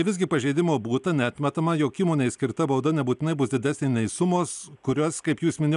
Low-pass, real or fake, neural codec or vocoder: 10.8 kHz; real; none